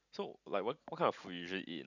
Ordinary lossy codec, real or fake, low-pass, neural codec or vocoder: none; real; 7.2 kHz; none